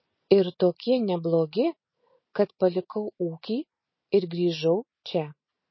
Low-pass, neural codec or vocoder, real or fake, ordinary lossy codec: 7.2 kHz; none; real; MP3, 24 kbps